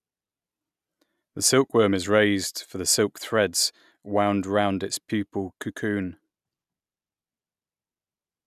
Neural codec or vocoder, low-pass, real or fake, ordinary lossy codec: none; 14.4 kHz; real; none